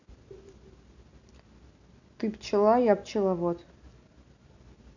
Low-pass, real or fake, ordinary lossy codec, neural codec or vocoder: 7.2 kHz; real; none; none